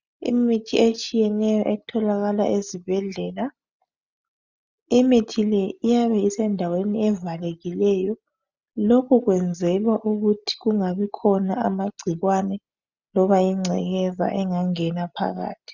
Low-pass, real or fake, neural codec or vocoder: 7.2 kHz; real; none